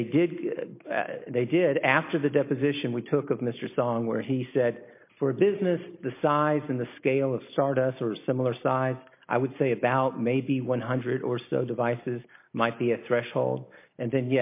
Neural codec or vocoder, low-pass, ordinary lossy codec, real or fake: none; 3.6 kHz; MP3, 24 kbps; real